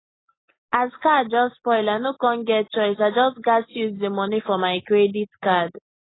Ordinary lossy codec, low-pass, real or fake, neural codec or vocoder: AAC, 16 kbps; 7.2 kHz; real; none